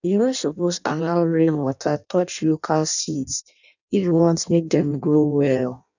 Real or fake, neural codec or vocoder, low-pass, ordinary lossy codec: fake; codec, 16 kHz in and 24 kHz out, 0.6 kbps, FireRedTTS-2 codec; 7.2 kHz; none